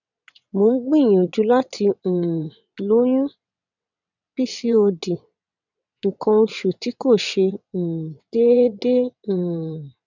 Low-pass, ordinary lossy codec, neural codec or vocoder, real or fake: 7.2 kHz; none; vocoder, 22.05 kHz, 80 mel bands, WaveNeXt; fake